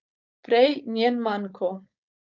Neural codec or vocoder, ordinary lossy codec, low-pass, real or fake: codec, 16 kHz, 4.8 kbps, FACodec; none; 7.2 kHz; fake